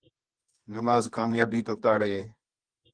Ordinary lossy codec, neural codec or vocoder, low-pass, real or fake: Opus, 24 kbps; codec, 24 kHz, 0.9 kbps, WavTokenizer, medium music audio release; 9.9 kHz; fake